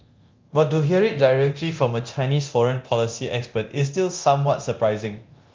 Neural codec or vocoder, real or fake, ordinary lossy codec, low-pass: codec, 24 kHz, 0.9 kbps, DualCodec; fake; Opus, 24 kbps; 7.2 kHz